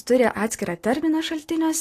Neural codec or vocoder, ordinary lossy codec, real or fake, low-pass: none; AAC, 48 kbps; real; 14.4 kHz